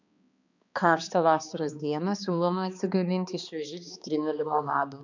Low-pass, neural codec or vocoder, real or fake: 7.2 kHz; codec, 16 kHz, 2 kbps, X-Codec, HuBERT features, trained on balanced general audio; fake